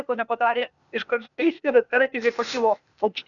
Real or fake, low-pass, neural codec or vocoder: fake; 7.2 kHz; codec, 16 kHz, 0.8 kbps, ZipCodec